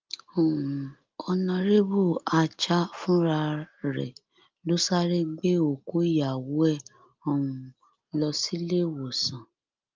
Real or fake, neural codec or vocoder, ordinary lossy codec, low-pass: real; none; Opus, 24 kbps; 7.2 kHz